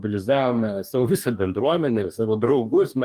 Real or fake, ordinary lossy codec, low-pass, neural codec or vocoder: fake; Opus, 32 kbps; 14.4 kHz; codec, 44.1 kHz, 2.6 kbps, DAC